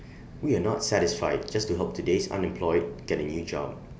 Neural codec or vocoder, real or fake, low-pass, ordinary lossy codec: none; real; none; none